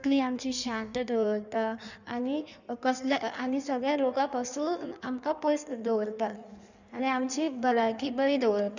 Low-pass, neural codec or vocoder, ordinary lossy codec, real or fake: 7.2 kHz; codec, 16 kHz in and 24 kHz out, 1.1 kbps, FireRedTTS-2 codec; none; fake